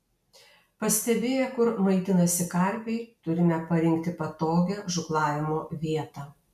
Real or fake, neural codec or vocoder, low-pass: real; none; 14.4 kHz